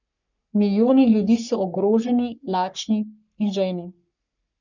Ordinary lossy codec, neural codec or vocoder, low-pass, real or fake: none; codec, 44.1 kHz, 3.4 kbps, Pupu-Codec; 7.2 kHz; fake